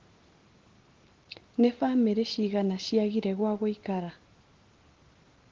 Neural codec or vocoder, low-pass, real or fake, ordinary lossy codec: none; 7.2 kHz; real; Opus, 16 kbps